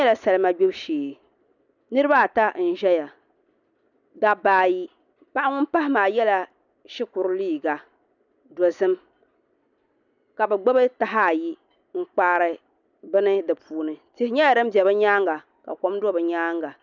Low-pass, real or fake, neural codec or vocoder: 7.2 kHz; real; none